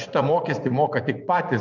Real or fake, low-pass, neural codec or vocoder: fake; 7.2 kHz; vocoder, 24 kHz, 100 mel bands, Vocos